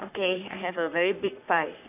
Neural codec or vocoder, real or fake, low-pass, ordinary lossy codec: codec, 44.1 kHz, 3.4 kbps, Pupu-Codec; fake; 3.6 kHz; AAC, 32 kbps